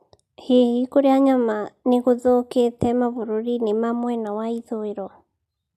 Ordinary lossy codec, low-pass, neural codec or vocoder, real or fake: none; 14.4 kHz; none; real